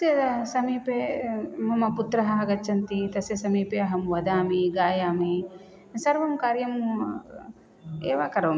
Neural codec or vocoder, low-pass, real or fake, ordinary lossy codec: none; none; real; none